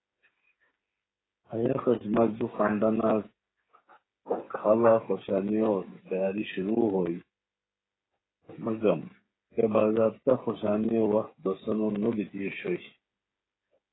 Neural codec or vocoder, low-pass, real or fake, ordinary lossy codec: codec, 16 kHz, 8 kbps, FreqCodec, smaller model; 7.2 kHz; fake; AAC, 16 kbps